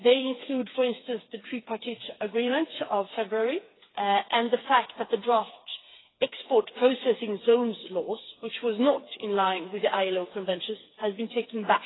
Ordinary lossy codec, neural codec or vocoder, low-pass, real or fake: AAC, 16 kbps; codec, 16 kHz, 4 kbps, FreqCodec, smaller model; 7.2 kHz; fake